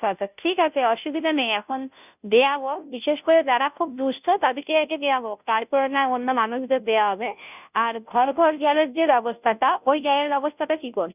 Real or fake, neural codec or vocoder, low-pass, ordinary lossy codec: fake; codec, 16 kHz, 0.5 kbps, FunCodec, trained on Chinese and English, 25 frames a second; 3.6 kHz; none